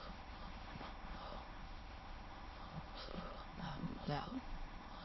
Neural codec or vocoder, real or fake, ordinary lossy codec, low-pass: autoencoder, 22.05 kHz, a latent of 192 numbers a frame, VITS, trained on many speakers; fake; MP3, 24 kbps; 7.2 kHz